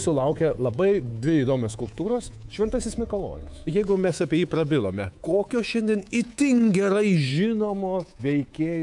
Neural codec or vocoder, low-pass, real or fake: codec, 24 kHz, 3.1 kbps, DualCodec; 10.8 kHz; fake